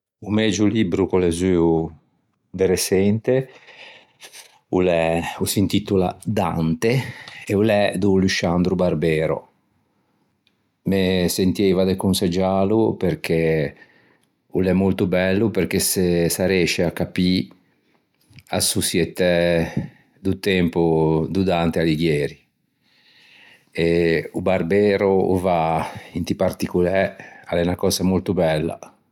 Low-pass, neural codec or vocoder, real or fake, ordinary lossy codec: 19.8 kHz; none; real; none